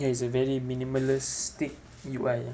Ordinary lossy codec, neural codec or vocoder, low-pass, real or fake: none; none; none; real